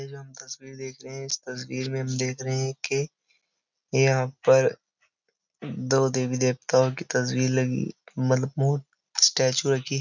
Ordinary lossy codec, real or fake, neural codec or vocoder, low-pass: none; real; none; 7.2 kHz